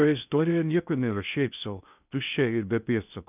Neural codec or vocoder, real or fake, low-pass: codec, 16 kHz in and 24 kHz out, 0.6 kbps, FocalCodec, streaming, 2048 codes; fake; 3.6 kHz